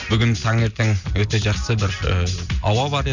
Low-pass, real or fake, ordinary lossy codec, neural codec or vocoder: 7.2 kHz; real; none; none